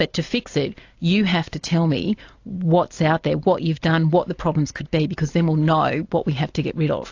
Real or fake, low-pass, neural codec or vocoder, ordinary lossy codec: real; 7.2 kHz; none; AAC, 48 kbps